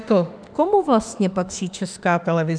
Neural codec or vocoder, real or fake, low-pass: autoencoder, 48 kHz, 32 numbers a frame, DAC-VAE, trained on Japanese speech; fake; 9.9 kHz